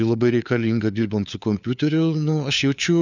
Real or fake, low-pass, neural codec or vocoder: fake; 7.2 kHz; codec, 16 kHz, 4 kbps, FunCodec, trained on LibriTTS, 50 frames a second